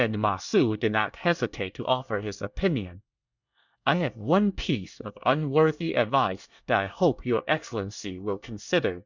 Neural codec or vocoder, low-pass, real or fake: codec, 24 kHz, 1 kbps, SNAC; 7.2 kHz; fake